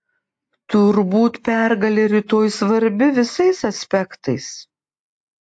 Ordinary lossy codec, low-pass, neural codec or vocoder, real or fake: AAC, 64 kbps; 9.9 kHz; none; real